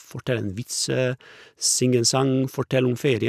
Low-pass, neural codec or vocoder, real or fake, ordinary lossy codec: 14.4 kHz; none; real; none